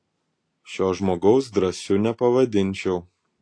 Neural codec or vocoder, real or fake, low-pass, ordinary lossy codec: none; real; 9.9 kHz; AAC, 48 kbps